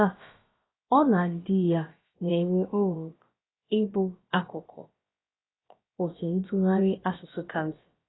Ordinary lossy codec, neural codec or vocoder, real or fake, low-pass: AAC, 16 kbps; codec, 16 kHz, about 1 kbps, DyCAST, with the encoder's durations; fake; 7.2 kHz